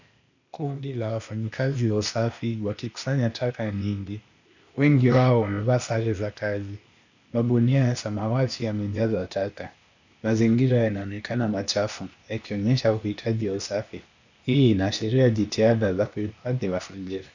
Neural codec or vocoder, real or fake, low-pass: codec, 16 kHz, 0.8 kbps, ZipCodec; fake; 7.2 kHz